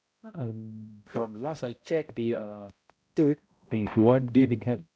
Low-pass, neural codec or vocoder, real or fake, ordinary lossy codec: none; codec, 16 kHz, 0.5 kbps, X-Codec, HuBERT features, trained on balanced general audio; fake; none